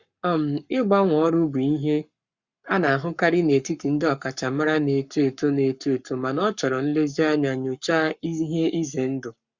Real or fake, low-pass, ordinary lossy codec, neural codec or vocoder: fake; 7.2 kHz; Opus, 64 kbps; codec, 44.1 kHz, 7.8 kbps, Pupu-Codec